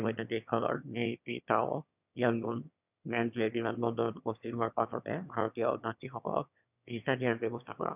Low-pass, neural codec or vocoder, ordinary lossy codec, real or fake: 3.6 kHz; autoencoder, 22.05 kHz, a latent of 192 numbers a frame, VITS, trained on one speaker; none; fake